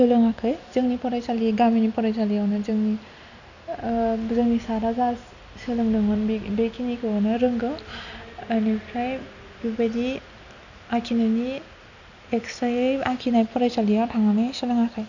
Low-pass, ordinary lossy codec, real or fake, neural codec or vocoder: 7.2 kHz; none; real; none